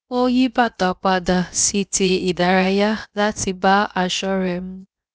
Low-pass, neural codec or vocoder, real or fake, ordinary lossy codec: none; codec, 16 kHz, about 1 kbps, DyCAST, with the encoder's durations; fake; none